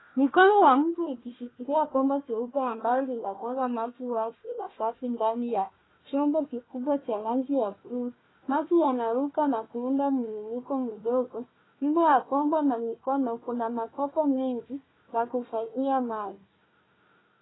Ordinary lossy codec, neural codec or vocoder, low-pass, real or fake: AAC, 16 kbps; codec, 16 kHz, 1 kbps, FunCodec, trained on Chinese and English, 50 frames a second; 7.2 kHz; fake